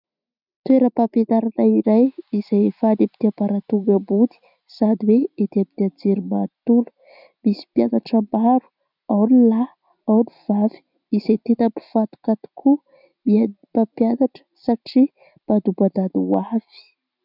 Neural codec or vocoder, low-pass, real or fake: none; 5.4 kHz; real